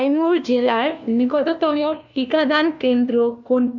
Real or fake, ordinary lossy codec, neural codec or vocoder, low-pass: fake; none; codec, 16 kHz, 1 kbps, FunCodec, trained on LibriTTS, 50 frames a second; 7.2 kHz